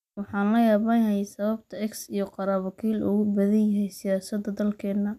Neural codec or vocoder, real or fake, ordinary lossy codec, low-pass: none; real; none; 14.4 kHz